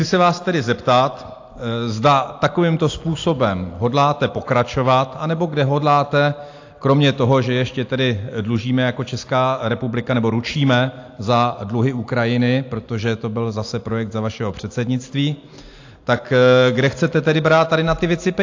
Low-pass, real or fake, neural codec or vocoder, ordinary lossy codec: 7.2 kHz; real; none; AAC, 48 kbps